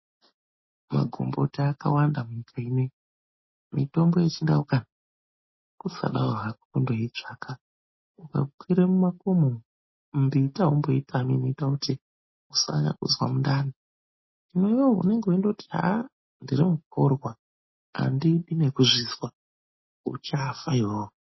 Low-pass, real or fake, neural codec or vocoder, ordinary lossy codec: 7.2 kHz; real; none; MP3, 24 kbps